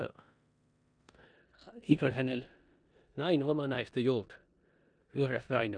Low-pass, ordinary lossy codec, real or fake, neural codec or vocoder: 9.9 kHz; none; fake; codec, 16 kHz in and 24 kHz out, 0.9 kbps, LongCat-Audio-Codec, four codebook decoder